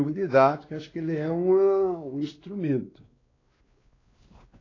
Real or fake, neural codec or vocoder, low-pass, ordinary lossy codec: fake; codec, 16 kHz, 2 kbps, X-Codec, WavLM features, trained on Multilingual LibriSpeech; 7.2 kHz; AAC, 32 kbps